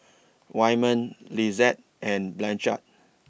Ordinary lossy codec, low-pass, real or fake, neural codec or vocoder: none; none; real; none